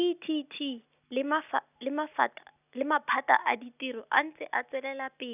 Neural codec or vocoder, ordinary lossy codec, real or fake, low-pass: none; none; real; 3.6 kHz